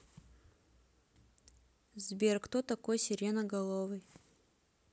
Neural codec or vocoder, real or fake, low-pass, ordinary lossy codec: none; real; none; none